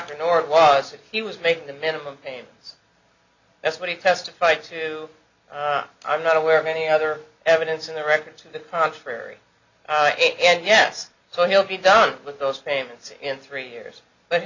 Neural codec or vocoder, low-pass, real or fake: none; 7.2 kHz; real